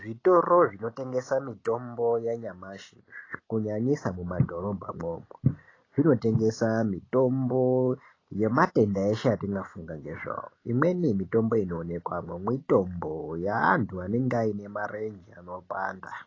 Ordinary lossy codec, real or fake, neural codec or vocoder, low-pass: AAC, 32 kbps; real; none; 7.2 kHz